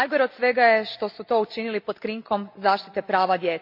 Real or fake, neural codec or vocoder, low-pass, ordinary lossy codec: real; none; 5.4 kHz; MP3, 48 kbps